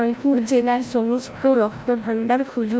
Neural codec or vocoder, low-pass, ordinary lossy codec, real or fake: codec, 16 kHz, 0.5 kbps, FreqCodec, larger model; none; none; fake